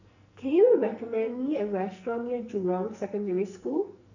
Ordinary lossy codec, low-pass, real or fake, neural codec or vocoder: none; 7.2 kHz; fake; codec, 44.1 kHz, 2.6 kbps, SNAC